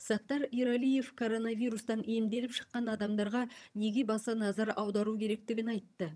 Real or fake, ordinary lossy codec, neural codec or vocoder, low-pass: fake; none; vocoder, 22.05 kHz, 80 mel bands, HiFi-GAN; none